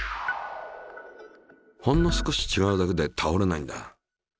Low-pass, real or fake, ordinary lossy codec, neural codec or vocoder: none; real; none; none